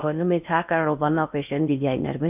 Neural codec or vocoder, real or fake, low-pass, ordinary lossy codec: codec, 16 kHz in and 24 kHz out, 0.6 kbps, FocalCodec, streaming, 4096 codes; fake; 3.6 kHz; none